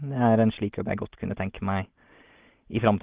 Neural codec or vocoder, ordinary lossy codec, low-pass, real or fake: none; Opus, 16 kbps; 3.6 kHz; real